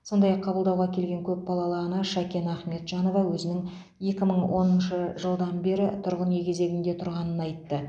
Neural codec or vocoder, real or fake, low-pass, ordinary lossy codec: none; real; none; none